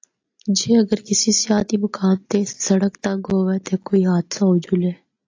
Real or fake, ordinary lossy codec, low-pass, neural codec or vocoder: real; AAC, 48 kbps; 7.2 kHz; none